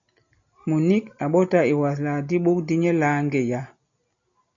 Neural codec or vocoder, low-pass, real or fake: none; 7.2 kHz; real